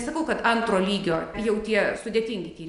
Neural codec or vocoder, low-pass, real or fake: none; 10.8 kHz; real